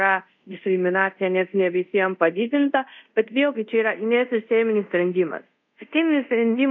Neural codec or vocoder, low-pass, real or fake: codec, 24 kHz, 0.5 kbps, DualCodec; 7.2 kHz; fake